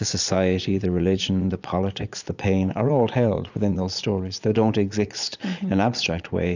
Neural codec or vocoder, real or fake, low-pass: vocoder, 22.05 kHz, 80 mel bands, Vocos; fake; 7.2 kHz